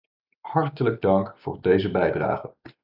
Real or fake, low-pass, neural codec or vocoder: real; 5.4 kHz; none